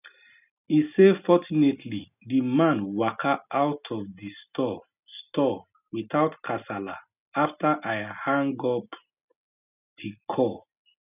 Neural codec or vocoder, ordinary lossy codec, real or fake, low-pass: none; none; real; 3.6 kHz